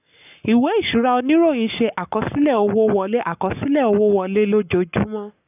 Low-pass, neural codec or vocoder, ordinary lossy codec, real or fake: 3.6 kHz; codec, 44.1 kHz, 7.8 kbps, Pupu-Codec; none; fake